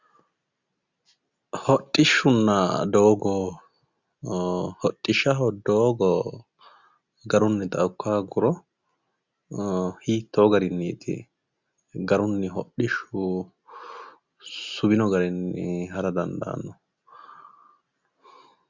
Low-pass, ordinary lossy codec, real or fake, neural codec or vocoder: 7.2 kHz; Opus, 64 kbps; real; none